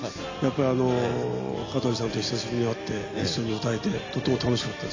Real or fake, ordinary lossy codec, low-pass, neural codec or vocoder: real; AAC, 32 kbps; 7.2 kHz; none